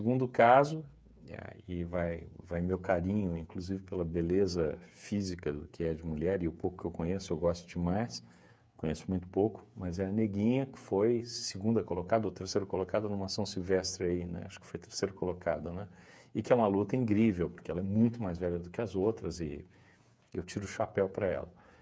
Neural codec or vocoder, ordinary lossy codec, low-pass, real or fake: codec, 16 kHz, 8 kbps, FreqCodec, smaller model; none; none; fake